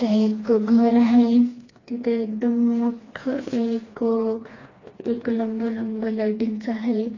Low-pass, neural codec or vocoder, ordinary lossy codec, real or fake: 7.2 kHz; codec, 16 kHz, 2 kbps, FreqCodec, smaller model; AAC, 32 kbps; fake